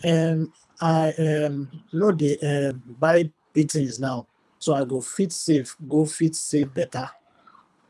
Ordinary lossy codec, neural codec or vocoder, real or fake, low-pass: none; codec, 24 kHz, 3 kbps, HILCodec; fake; none